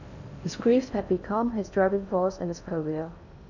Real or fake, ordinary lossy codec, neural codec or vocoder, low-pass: fake; none; codec, 16 kHz in and 24 kHz out, 0.6 kbps, FocalCodec, streaming, 4096 codes; 7.2 kHz